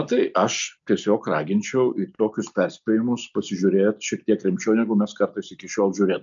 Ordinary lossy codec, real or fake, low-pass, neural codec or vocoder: MP3, 64 kbps; real; 7.2 kHz; none